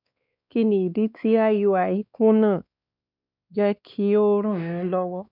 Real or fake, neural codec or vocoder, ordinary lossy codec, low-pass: fake; codec, 16 kHz, 2 kbps, X-Codec, WavLM features, trained on Multilingual LibriSpeech; none; 5.4 kHz